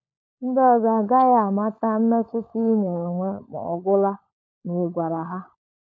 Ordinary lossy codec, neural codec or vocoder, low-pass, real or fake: none; codec, 16 kHz, 16 kbps, FunCodec, trained on LibriTTS, 50 frames a second; none; fake